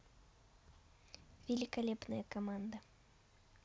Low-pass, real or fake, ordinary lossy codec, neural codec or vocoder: none; real; none; none